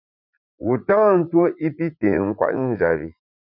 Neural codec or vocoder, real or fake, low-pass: vocoder, 44.1 kHz, 80 mel bands, Vocos; fake; 5.4 kHz